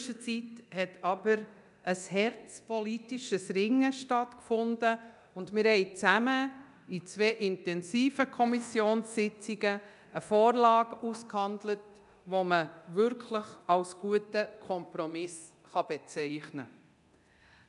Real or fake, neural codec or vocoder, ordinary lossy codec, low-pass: fake; codec, 24 kHz, 0.9 kbps, DualCodec; none; 10.8 kHz